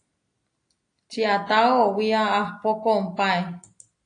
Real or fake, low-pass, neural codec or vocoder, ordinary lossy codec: real; 9.9 kHz; none; AAC, 48 kbps